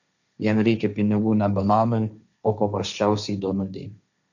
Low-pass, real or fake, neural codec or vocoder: 7.2 kHz; fake; codec, 16 kHz, 1.1 kbps, Voila-Tokenizer